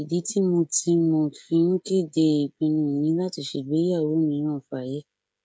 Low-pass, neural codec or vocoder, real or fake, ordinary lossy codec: none; codec, 16 kHz, 16 kbps, FreqCodec, smaller model; fake; none